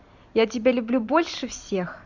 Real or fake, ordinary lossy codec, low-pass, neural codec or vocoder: fake; none; 7.2 kHz; vocoder, 44.1 kHz, 128 mel bands every 512 samples, BigVGAN v2